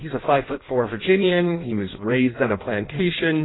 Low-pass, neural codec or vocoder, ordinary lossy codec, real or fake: 7.2 kHz; codec, 16 kHz in and 24 kHz out, 0.6 kbps, FireRedTTS-2 codec; AAC, 16 kbps; fake